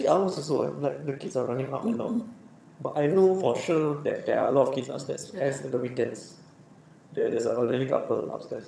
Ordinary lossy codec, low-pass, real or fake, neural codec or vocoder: none; none; fake; vocoder, 22.05 kHz, 80 mel bands, HiFi-GAN